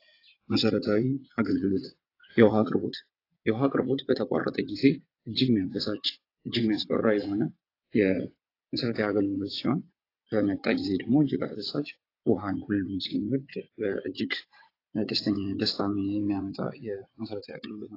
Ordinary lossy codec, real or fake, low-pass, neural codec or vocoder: AAC, 32 kbps; fake; 5.4 kHz; vocoder, 22.05 kHz, 80 mel bands, WaveNeXt